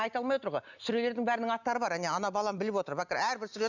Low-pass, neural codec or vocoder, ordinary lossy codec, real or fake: 7.2 kHz; none; none; real